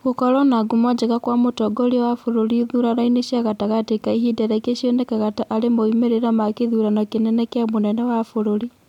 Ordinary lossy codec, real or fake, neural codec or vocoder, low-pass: none; real; none; 19.8 kHz